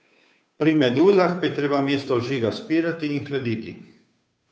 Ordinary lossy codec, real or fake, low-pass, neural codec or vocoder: none; fake; none; codec, 16 kHz, 2 kbps, FunCodec, trained on Chinese and English, 25 frames a second